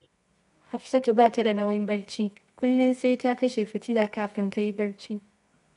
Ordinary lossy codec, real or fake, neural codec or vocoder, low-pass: none; fake; codec, 24 kHz, 0.9 kbps, WavTokenizer, medium music audio release; 10.8 kHz